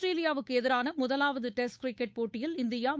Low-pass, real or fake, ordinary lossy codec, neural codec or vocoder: none; fake; none; codec, 16 kHz, 8 kbps, FunCodec, trained on Chinese and English, 25 frames a second